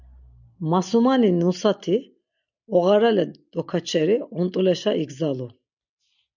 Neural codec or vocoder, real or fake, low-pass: none; real; 7.2 kHz